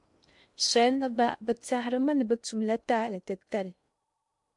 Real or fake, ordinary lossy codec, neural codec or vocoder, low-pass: fake; MP3, 64 kbps; codec, 16 kHz in and 24 kHz out, 0.6 kbps, FocalCodec, streaming, 2048 codes; 10.8 kHz